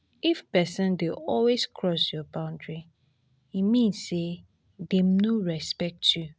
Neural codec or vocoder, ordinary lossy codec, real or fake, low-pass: none; none; real; none